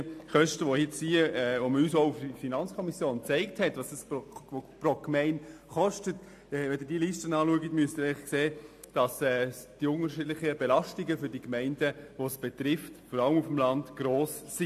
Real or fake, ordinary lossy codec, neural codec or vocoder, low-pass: real; AAC, 64 kbps; none; 14.4 kHz